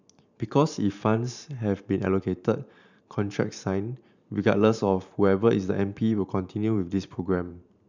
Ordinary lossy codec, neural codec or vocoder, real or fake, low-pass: none; vocoder, 44.1 kHz, 128 mel bands every 512 samples, BigVGAN v2; fake; 7.2 kHz